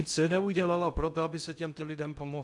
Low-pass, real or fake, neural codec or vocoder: 10.8 kHz; fake; codec, 16 kHz in and 24 kHz out, 0.6 kbps, FocalCodec, streaming, 2048 codes